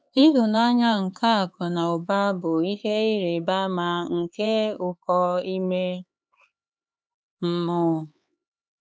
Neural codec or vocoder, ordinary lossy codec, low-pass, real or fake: codec, 16 kHz, 4 kbps, X-Codec, HuBERT features, trained on LibriSpeech; none; none; fake